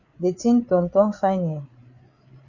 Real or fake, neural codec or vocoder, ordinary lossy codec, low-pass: fake; codec, 16 kHz, 8 kbps, FreqCodec, larger model; Opus, 64 kbps; 7.2 kHz